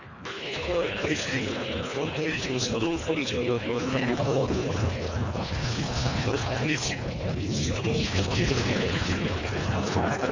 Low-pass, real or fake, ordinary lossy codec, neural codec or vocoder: 7.2 kHz; fake; MP3, 48 kbps; codec, 24 kHz, 1.5 kbps, HILCodec